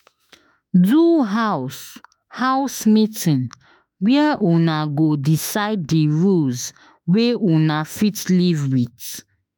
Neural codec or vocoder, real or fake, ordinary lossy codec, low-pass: autoencoder, 48 kHz, 32 numbers a frame, DAC-VAE, trained on Japanese speech; fake; none; none